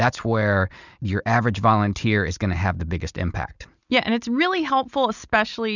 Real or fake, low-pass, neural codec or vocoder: real; 7.2 kHz; none